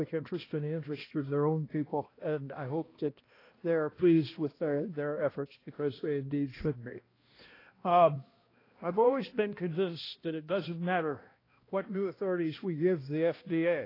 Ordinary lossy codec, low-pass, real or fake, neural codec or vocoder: AAC, 24 kbps; 5.4 kHz; fake; codec, 16 kHz, 1 kbps, X-Codec, HuBERT features, trained on balanced general audio